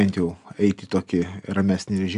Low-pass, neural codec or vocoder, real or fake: 10.8 kHz; none; real